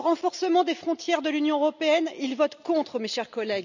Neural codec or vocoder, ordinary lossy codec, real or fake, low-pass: none; none; real; 7.2 kHz